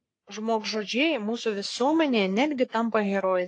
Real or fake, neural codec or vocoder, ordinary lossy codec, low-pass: fake; codec, 44.1 kHz, 3.4 kbps, Pupu-Codec; AAC, 48 kbps; 9.9 kHz